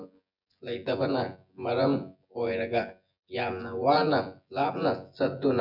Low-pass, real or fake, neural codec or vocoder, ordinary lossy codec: 5.4 kHz; fake; vocoder, 24 kHz, 100 mel bands, Vocos; none